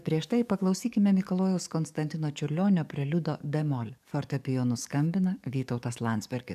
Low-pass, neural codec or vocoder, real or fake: 14.4 kHz; codec, 44.1 kHz, 7.8 kbps, DAC; fake